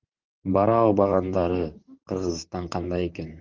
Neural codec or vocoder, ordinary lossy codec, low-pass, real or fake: vocoder, 44.1 kHz, 128 mel bands, Pupu-Vocoder; Opus, 32 kbps; 7.2 kHz; fake